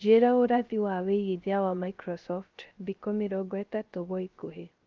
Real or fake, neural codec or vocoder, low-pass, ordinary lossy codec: fake; codec, 16 kHz, 0.3 kbps, FocalCodec; 7.2 kHz; Opus, 32 kbps